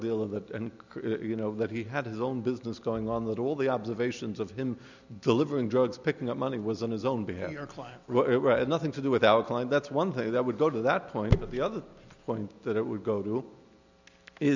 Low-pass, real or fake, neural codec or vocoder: 7.2 kHz; real; none